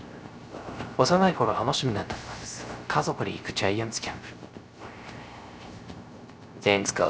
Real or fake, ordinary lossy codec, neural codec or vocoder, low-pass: fake; none; codec, 16 kHz, 0.3 kbps, FocalCodec; none